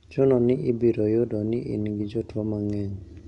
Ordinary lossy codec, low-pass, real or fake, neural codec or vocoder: none; 10.8 kHz; real; none